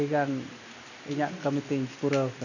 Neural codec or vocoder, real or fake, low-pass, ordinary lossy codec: none; real; 7.2 kHz; none